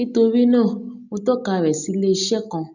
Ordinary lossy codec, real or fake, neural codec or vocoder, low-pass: none; real; none; 7.2 kHz